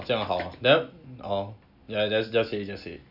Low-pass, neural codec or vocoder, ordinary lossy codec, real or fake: 5.4 kHz; none; none; real